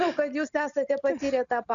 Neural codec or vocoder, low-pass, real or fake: none; 7.2 kHz; real